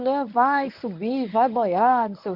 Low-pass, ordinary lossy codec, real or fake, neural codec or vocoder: 5.4 kHz; none; fake; codec, 24 kHz, 0.9 kbps, WavTokenizer, medium speech release version 1